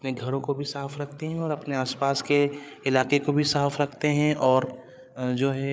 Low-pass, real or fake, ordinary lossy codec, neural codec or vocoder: none; fake; none; codec, 16 kHz, 8 kbps, FreqCodec, larger model